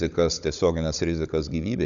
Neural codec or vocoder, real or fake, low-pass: codec, 16 kHz, 16 kbps, FunCodec, trained on Chinese and English, 50 frames a second; fake; 7.2 kHz